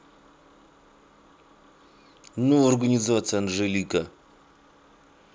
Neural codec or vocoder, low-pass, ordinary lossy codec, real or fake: none; none; none; real